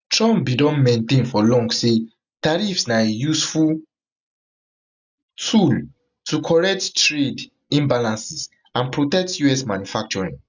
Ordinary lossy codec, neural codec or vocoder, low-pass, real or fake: none; none; 7.2 kHz; real